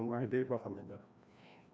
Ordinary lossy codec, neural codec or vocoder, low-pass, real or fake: none; codec, 16 kHz, 1 kbps, FreqCodec, larger model; none; fake